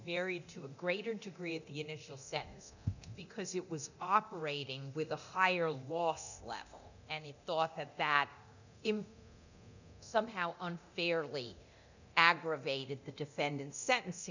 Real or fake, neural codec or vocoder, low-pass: fake; codec, 24 kHz, 0.9 kbps, DualCodec; 7.2 kHz